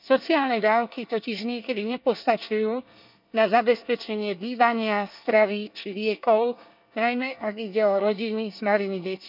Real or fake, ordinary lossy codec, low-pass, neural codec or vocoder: fake; none; 5.4 kHz; codec, 24 kHz, 1 kbps, SNAC